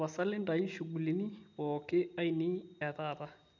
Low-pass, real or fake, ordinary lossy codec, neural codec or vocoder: 7.2 kHz; real; none; none